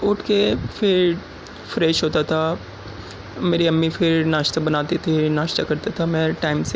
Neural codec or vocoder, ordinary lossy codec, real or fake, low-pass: none; none; real; none